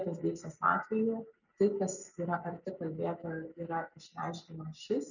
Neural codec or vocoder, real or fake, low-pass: none; real; 7.2 kHz